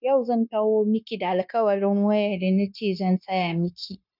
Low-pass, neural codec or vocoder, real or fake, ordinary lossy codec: 5.4 kHz; codec, 16 kHz, 0.9 kbps, LongCat-Audio-Codec; fake; none